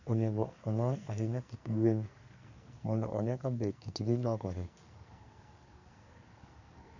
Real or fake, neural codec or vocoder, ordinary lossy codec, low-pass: fake; codec, 44.1 kHz, 2.6 kbps, SNAC; none; 7.2 kHz